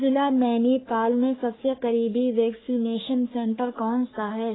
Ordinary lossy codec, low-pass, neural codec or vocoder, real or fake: AAC, 16 kbps; 7.2 kHz; codec, 44.1 kHz, 3.4 kbps, Pupu-Codec; fake